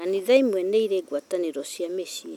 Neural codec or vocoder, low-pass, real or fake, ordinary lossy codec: none; 19.8 kHz; real; none